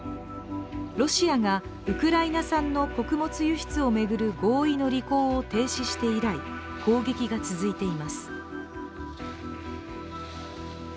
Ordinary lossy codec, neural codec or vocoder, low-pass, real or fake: none; none; none; real